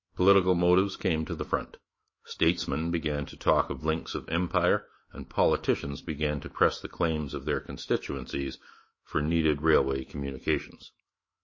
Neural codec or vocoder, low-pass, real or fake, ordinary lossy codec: autoencoder, 48 kHz, 128 numbers a frame, DAC-VAE, trained on Japanese speech; 7.2 kHz; fake; MP3, 32 kbps